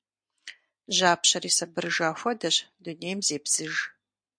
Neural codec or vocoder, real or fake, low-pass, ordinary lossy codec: none; real; 9.9 kHz; MP3, 64 kbps